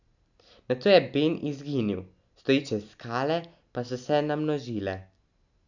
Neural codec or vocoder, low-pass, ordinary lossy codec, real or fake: none; 7.2 kHz; none; real